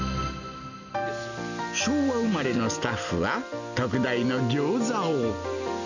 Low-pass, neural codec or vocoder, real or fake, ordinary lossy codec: 7.2 kHz; none; real; none